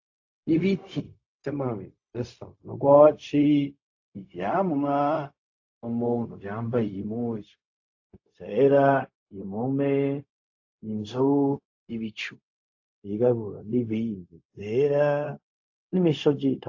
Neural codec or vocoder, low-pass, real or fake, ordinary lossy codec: codec, 16 kHz, 0.4 kbps, LongCat-Audio-Codec; 7.2 kHz; fake; AAC, 48 kbps